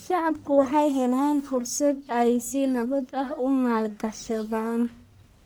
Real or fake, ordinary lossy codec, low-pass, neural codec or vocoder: fake; none; none; codec, 44.1 kHz, 1.7 kbps, Pupu-Codec